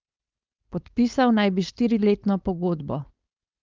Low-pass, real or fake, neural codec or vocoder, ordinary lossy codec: 7.2 kHz; fake; codec, 16 kHz, 4.8 kbps, FACodec; Opus, 32 kbps